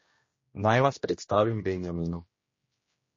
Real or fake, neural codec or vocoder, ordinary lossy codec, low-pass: fake; codec, 16 kHz, 1 kbps, X-Codec, HuBERT features, trained on general audio; MP3, 32 kbps; 7.2 kHz